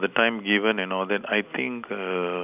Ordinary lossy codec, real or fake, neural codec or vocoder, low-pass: none; real; none; 3.6 kHz